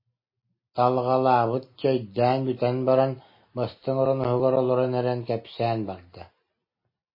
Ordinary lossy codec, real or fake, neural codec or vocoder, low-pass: MP3, 24 kbps; real; none; 5.4 kHz